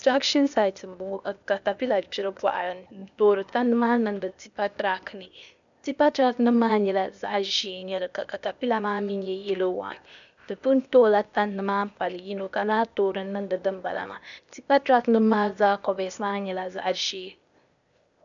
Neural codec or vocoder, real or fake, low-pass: codec, 16 kHz, 0.8 kbps, ZipCodec; fake; 7.2 kHz